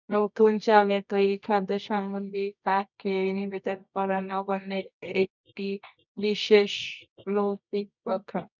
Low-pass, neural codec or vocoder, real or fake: 7.2 kHz; codec, 24 kHz, 0.9 kbps, WavTokenizer, medium music audio release; fake